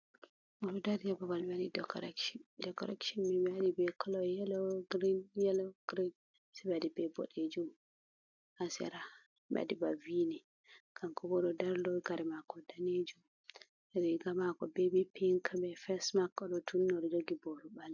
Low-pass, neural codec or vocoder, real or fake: 7.2 kHz; none; real